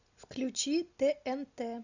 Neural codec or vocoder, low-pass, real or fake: none; 7.2 kHz; real